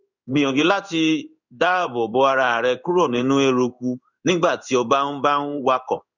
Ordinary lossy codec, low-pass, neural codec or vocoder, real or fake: none; 7.2 kHz; codec, 16 kHz in and 24 kHz out, 1 kbps, XY-Tokenizer; fake